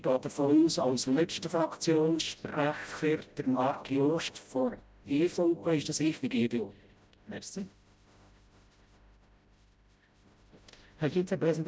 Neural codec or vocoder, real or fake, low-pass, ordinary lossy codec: codec, 16 kHz, 0.5 kbps, FreqCodec, smaller model; fake; none; none